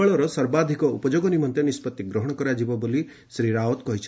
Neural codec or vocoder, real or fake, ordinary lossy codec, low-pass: none; real; none; none